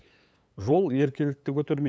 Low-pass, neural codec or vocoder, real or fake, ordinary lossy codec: none; codec, 16 kHz, 8 kbps, FunCodec, trained on LibriTTS, 25 frames a second; fake; none